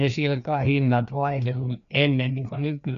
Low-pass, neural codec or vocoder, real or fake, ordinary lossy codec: 7.2 kHz; codec, 16 kHz, 2 kbps, FreqCodec, larger model; fake; none